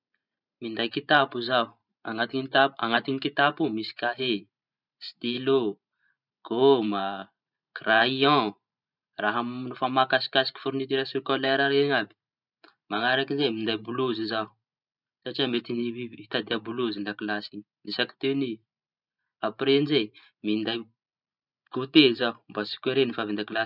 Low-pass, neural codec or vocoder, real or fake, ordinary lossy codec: 5.4 kHz; vocoder, 44.1 kHz, 128 mel bands every 512 samples, BigVGAN v2; fake; none